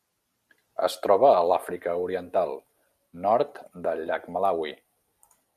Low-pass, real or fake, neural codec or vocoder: 14.4 kHz; real; none